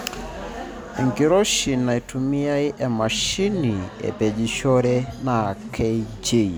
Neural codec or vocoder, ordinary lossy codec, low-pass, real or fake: none; none; none; real